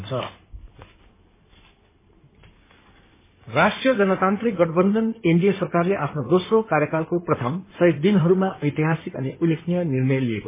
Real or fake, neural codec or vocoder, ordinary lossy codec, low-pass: fake; codec, 16 kHz in and 24 kHz out, 2.2 kbps, FireRedTTS-2 codec; MP3, 16 kbps; 3.6 kHz